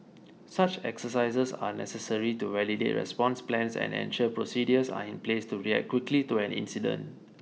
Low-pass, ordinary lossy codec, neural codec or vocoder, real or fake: none; none; none; real